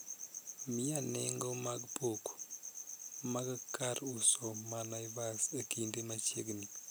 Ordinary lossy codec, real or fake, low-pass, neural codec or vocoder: none; real; none; none